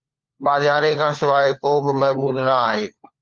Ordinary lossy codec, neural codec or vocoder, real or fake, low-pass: Opus, 32 kbps; codec, 16 kHz, 4 kbps, FunCodec, trained on LibriTTS, 50 frames a second; fake; 7.2 kHz